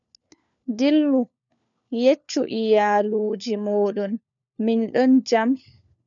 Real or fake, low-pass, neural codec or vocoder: fake; 7.2 kHz; codec, 16 kHz, 4 kbps, FunCodec, trained on LibriTTS, 50 frames a second